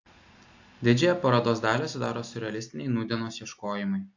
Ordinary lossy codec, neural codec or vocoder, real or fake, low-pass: AAC, 48 kbps; none; real; 7.2 kHz